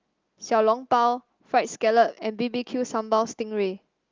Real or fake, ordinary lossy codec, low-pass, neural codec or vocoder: real; Opus, 32 kbps; 7.2 kHz; none